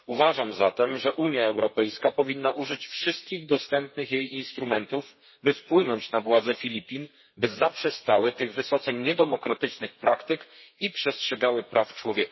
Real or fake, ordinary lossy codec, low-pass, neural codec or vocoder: fake; MP3, 24 kbps; 7.2 kHz; codec, 32 kHz, 1.9 kbps, SNAC